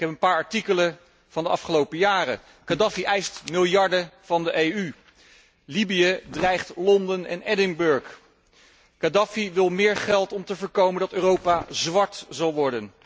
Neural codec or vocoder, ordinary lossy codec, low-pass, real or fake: none; none; none; real